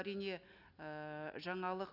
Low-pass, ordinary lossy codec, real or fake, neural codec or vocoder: 5.4 kHz; none; real; none